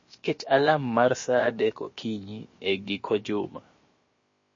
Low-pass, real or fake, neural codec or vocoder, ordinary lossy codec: 7.2 kHz; fake; codec, 16 kHz, about 1 kbps, DyCAST, with the encoder's durations; MP3, 32 kbps